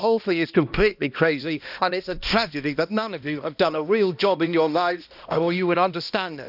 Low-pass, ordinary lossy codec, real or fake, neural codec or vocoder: 5.4 kHz; none; fake; codec, 16 kHz, 1 kbps, X-Codec, HuBERT features, trained on balanced general audio